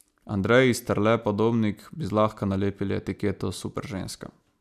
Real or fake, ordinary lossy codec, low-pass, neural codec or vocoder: real; none; 14.4 kHz; none